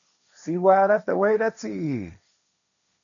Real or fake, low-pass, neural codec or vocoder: fake; 7.2 kHz; codec, 16 kHz, 1.1 kbps, Voila-Tokenizer